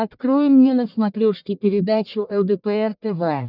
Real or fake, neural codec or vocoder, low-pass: fake; codec, 44.1 kHz, 1.7 kbps, Pupu-Codec; 5.4 kHz